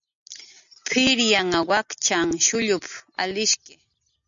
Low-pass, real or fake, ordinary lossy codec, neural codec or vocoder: 7.2 kHz; real; MP3, 96 kbps; none